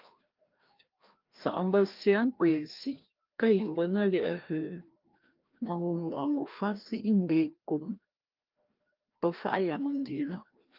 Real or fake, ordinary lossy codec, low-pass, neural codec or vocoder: fake; Opus, 32 kbps; 5.4 kHz; codec, 16 kHz, 1 kbps, FreqCodec, larger model